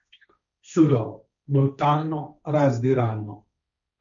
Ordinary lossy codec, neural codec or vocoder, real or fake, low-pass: none; codec, 16 kHz, 1.1 kbps, Voila-Tokenizer; fake; 7.2 kHz